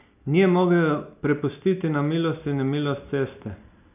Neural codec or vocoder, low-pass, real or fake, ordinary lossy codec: none; 3.6 kHz; real; none